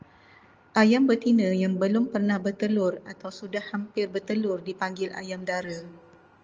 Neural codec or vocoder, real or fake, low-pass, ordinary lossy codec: none; real; 7.2 kHz; Opus, 24 kbps